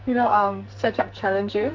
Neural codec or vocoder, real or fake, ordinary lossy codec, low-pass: codec, 44.1 kHz, 2.6 kbps, SNAC; fake; MP3, 64 kbps; 7.2 kHz